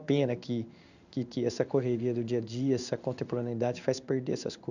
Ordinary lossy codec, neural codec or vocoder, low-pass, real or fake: none; codec, 16 kHz in and 24 kHz out, 1 kbps, XY-Tokenizer; 7.2 kHz; fake